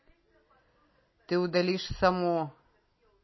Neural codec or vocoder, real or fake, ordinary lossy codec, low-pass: none; real; MP3, 24 kbps; 7.2 kHz